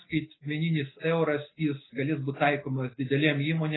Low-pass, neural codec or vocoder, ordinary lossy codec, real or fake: 7.2 kHz; none; AAC, 16 kbps; real